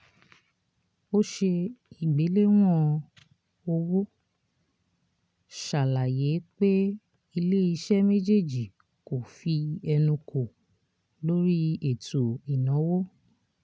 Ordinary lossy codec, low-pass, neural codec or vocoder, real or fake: none; none; none; real